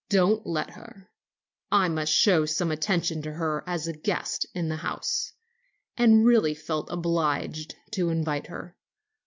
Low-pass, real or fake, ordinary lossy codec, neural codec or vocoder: 7.2 kHz; real; MP3, 64 kbps; none